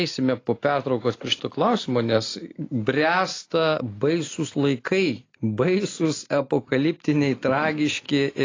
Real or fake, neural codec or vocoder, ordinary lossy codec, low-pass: real; none; AAC, 32 kbps; 7.2 kHz